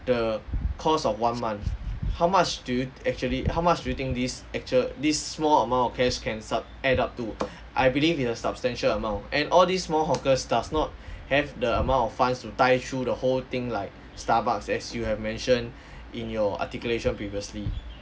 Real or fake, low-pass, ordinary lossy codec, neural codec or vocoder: real; none; none; none